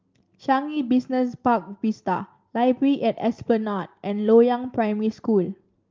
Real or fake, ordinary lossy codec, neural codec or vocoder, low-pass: real; Opus, 32 kbps; none; 7.2 kHz